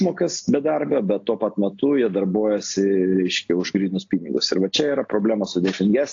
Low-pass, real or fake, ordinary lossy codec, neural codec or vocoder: 7.2 kHz; real; AAC, 48 kbps; none